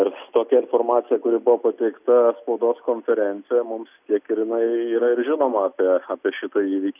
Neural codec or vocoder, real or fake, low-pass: none; real; 3.6 kHz